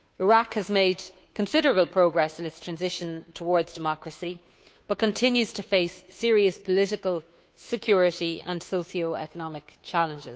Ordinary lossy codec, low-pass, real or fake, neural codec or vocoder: none; none; fake; codec, 16 kHz, 2 kbps, FunCodec, trained on Chinese and English, 25 frames a second